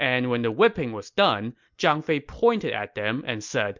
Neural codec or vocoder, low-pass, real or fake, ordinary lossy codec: none; 7.2 kHz; real; MP3, 64 kbps